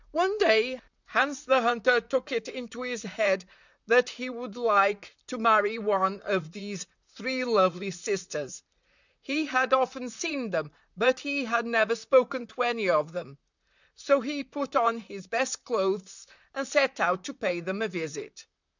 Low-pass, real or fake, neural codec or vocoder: 7.2 kHz; fake; vocoder, 44.1 kHz, 128 mel bands, Pupu-Vocoder